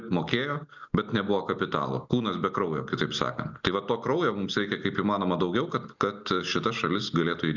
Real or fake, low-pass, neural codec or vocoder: real; 7.2 kHz; none